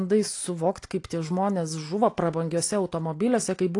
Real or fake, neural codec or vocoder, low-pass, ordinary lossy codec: real; none; 10.8 kHz; AAC, 48 kbps